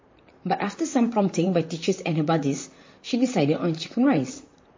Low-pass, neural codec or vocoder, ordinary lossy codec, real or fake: 7.2 kHz; vocoder, 44.1 kHz, 128 mel bands, Pupu-Vocoder; MP3, 32 kbps; fake